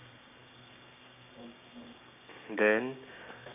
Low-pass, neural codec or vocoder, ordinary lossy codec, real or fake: 3.6 kHz; codec, 16 kHz, 6 kbps, DAC; none; fake